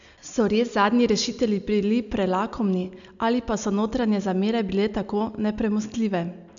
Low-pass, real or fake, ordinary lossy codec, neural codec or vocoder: 7.2 kHz; real; none; none